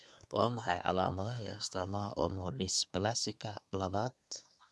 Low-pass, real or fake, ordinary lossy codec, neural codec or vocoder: none; fake; none; codec, 24 kHz, 1 kbps, SNAC